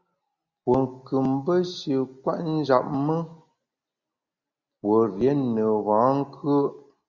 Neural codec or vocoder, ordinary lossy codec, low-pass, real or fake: none; Opus, 64 kbps; 7.2 kHz; real